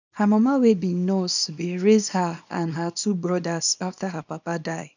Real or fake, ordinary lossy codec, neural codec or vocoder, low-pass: fake; none; codec, 24 kHz, 0.9 kbps, WavTokenizer, small release; 7.2 kHz